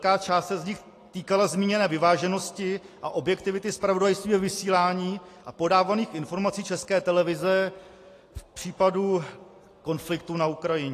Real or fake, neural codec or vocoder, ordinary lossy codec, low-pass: real; none; AAC, 48 kbps; 14.4 kHz